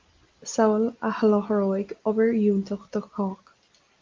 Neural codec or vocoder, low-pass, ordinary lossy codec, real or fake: none; 7.2 kHz; Opus, 32 kbps; real